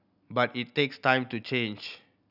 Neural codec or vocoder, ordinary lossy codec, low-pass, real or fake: none; none; 5.4 kHz; real